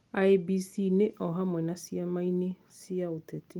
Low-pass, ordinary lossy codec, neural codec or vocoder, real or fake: 19.8 kHz; Opus, 24 kbps; none; real